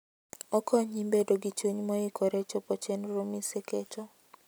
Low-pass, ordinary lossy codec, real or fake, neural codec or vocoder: none; none; real; none